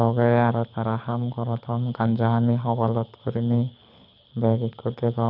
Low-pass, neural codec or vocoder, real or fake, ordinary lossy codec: 5.4 kHz; codec, 24 kHz, 6 kbps, HILCodec; fake; none